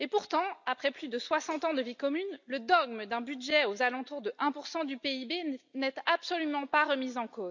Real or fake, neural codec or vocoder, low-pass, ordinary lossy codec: real; none; 7.2 kHz; none